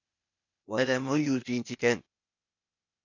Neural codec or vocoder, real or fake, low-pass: codec, 16 kHz, 0.8 kbps, ZipCodec; fake; 7.2 kHz